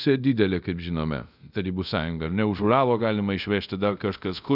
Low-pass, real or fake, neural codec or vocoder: 5.4 kHz; fake; codec, 24 kHz, 0.5 kbps, DualCodec